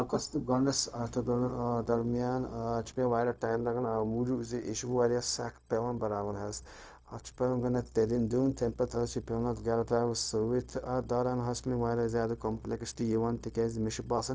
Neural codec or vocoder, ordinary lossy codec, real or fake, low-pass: codec, 16 kHz, 0.4 kbps, LongCat-Audio-Codec; none; fake; none